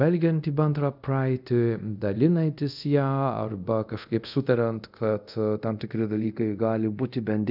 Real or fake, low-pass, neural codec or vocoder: fake; 5.4 kHz; codec, 24 kHz, 0.5 kbps, DualCodec